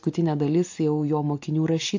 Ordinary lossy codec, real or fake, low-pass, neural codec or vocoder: AAC, 48 kbps; real; 7.2 kHz; none